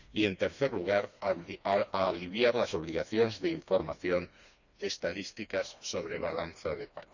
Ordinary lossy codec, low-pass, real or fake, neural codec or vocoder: none; 7.2 kHz; fake; codec, 16 kHz, 2 kbps, FreqCodec, smaller model